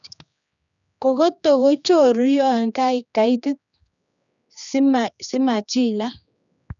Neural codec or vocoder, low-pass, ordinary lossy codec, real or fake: codec, 16 kHz, 2 kbps, X-Codec, HuBERT features, trained on general audio; 7.2 kHz; none; fake